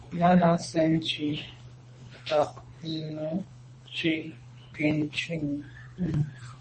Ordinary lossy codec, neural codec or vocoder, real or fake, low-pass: MP3, 32 kbps; codec, 24 kHz, 3 kbps, HILCodec; fake; 10.8 kHz